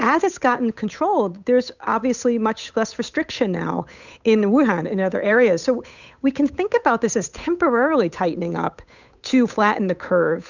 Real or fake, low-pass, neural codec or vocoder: fake; 7.2 kHz; codec, 16 kHz, 8 kbps, FunCodec, trained on Chinese and English, 25 frames a second